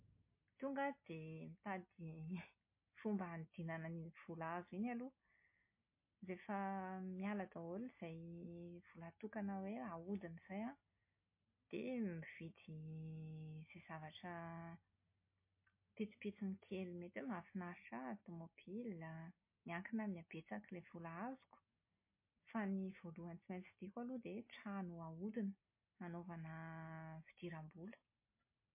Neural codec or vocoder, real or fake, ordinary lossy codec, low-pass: none; real; MP3, 24 kbps; 3.6 kHz